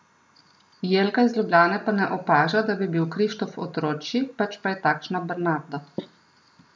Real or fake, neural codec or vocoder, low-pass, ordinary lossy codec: real; none; none; none